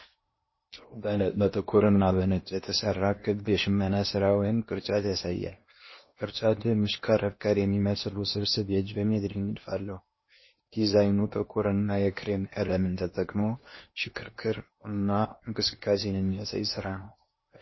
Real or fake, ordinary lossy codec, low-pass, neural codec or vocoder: fake; MP3, 24 kbps; 7.2 kHz; codec, 16 kHz in and 24 kHz out, 0.8 kbps, FocalCodec, streaming, 65536 codes